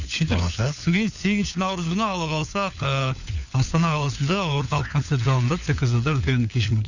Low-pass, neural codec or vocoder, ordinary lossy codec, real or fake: 7.2 kHz; codec, 16 kHz, 4 kbps, FunCodec, trained on LibriTTS, 50 frames a second; none; fake